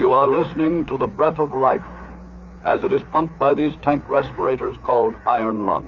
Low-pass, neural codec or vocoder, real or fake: 7.2 kHz; codec, 16 kHz, 4 kbps, FreqCodec, larger model; fake